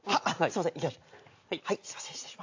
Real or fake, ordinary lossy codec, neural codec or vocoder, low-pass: real; AAC, 48 kbps; none; 7.2 kHz